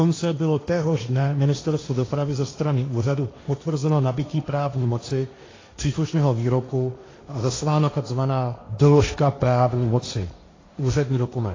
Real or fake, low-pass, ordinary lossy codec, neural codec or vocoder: fake; 7.2 kHz; AAC, 32 kbps; codec, 16 kHz, 1.1 kbps, Voila-Tokenizer